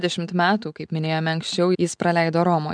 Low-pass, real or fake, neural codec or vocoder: 9.9 kHz; real; none